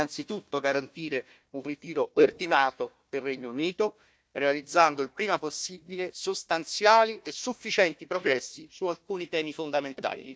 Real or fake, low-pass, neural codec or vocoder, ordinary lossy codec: fake; none; codec, 16 kHz, 1 kbps, FunCodec, trained on Chinese and English, 50 frames a second; none